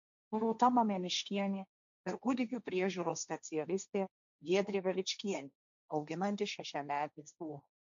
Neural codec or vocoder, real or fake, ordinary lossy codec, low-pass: codec, 16 kHz, 1.1 kbps, Voila-Tokenizer; fake; MP3, 64 kbps; 7.2 kHz